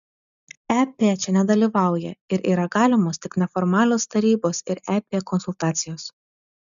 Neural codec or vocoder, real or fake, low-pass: none; real; 7.2 kHz